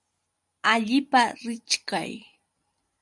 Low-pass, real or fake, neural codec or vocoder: 10.8 kHz; real; none